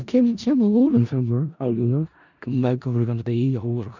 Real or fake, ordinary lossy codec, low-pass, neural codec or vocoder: fake; none; 7.2 kHz; codec, 16 kHz in and 24 kHz out, 0.4 kbps, LongCat-Audio-Codec, four codebook decoder